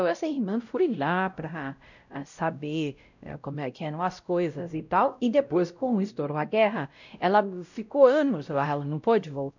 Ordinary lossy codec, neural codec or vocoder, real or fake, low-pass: none; codec, 16 kHz, 0.5 kbps, X-Codec, WavLM features, trained on Multilingual LibriSpeech; fake; 7.2 kHz